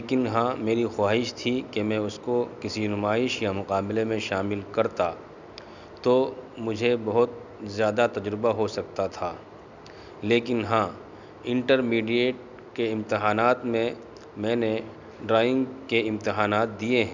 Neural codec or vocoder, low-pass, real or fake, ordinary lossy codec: none; 7.2 kHz; real; none